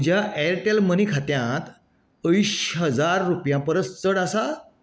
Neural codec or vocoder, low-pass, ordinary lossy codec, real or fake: none; none; none; real